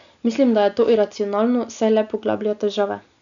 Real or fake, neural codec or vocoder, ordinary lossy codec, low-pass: real; none; none; 7.2 kHz